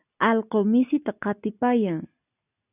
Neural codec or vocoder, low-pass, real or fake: none; 3.6 kHz; real